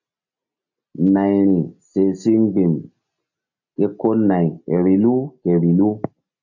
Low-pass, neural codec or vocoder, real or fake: 7.2 kHz; none; real